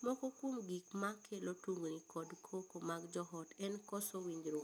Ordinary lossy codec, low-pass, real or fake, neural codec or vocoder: none; none; real; none